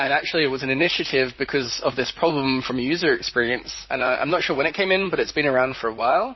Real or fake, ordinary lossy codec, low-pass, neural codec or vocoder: fake; MP3, 24 kbps; 7.2 kHz; vocoder, 44.1 kHz, 128 mel bands, Pupu-Vocoder